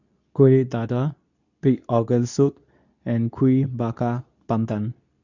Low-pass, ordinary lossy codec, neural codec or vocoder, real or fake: 7.2 kHz; none; codec, 24 kHz, 0.9 kbps, WavTokenizer, medium speech release version 2; fake